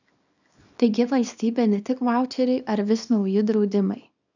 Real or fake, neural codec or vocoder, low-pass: fake; codec, 24 kHz, 0.9 kbps, WavTokenizer, small release; 7.2 kHz